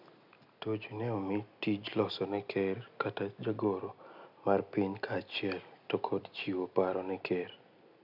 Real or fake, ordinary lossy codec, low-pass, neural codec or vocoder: real; none; 5.4 kHz; none